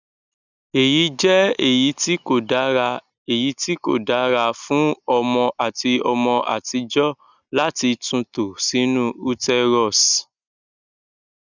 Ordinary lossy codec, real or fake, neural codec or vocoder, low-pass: none; real; none; 7.2 kHz